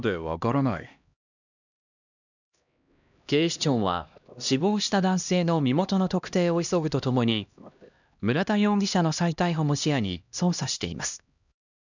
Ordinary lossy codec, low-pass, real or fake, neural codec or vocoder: none; 7.2 kHz; fake; codec, 16 kHz, 1 kbps, X-Codec, HuBERT features, trained on LibriSpeech